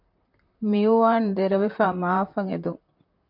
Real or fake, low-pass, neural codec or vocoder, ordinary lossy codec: fake; 5.4 kHz; vocoder, 44.1 kHz, 128 mel bands, Pupu-Vocoder; MP3, 48 kbps